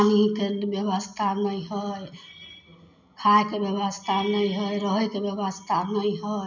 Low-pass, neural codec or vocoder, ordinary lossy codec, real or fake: 7.2 kHz; none; none; real